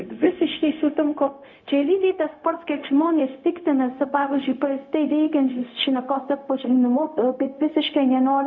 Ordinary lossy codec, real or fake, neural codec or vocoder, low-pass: MP3, 48 kbps; fake; codec, 16 kHz, 0.4 kbps, LongCat-Audio-Codec; 7.2 kHz